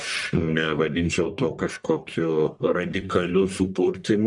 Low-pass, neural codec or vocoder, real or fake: 10.8 kHz; codec, 44.1 kHz, 1.7 kbps, Pupu-Codec; fake